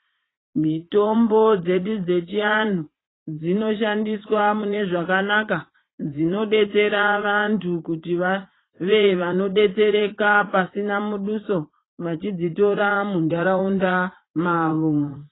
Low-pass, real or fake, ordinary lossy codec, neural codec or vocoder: 7.2 kHz; fake; AAC, 16 kbps; vocoder, 44.1 kHz, 80 mel bands, Vocos